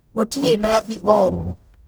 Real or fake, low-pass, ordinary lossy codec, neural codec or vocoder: fake; none; none; codec, 44.1 kHz, 0.9 kbps, DAC